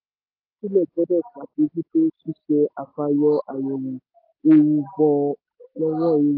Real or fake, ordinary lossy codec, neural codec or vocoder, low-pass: real; AAC, 32 kbps; none; 5.4 kHz